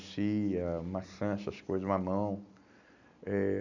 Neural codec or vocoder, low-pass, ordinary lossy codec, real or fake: none; 7.2 kHz; none; real